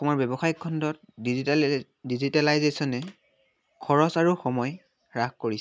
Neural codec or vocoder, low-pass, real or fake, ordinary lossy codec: none; none; real; none